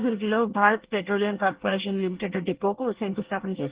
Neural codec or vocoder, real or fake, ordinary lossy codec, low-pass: codec, 24 kHz, 1 kbps, SNAC; fake; Opus, 16 kbps; 3.6 kHz